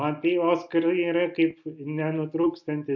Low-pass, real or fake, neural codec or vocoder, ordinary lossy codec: 7.2 kHz; real; none; MP3, 48 kbps